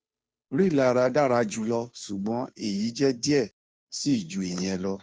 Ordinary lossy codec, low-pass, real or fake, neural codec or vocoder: none; none; fake; codec, 16 kHz, 2 kbps, FunCodec, trained on Chinese and English, 25 frames a second